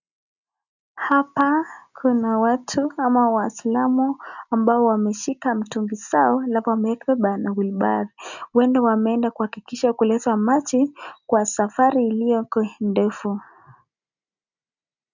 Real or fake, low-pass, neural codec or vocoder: real; 7.2 kHz; none